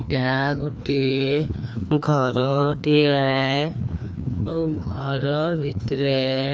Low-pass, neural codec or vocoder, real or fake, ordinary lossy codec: none; codec, 16 kHz, 2 kbps, FreqCodec, larger model; fake; none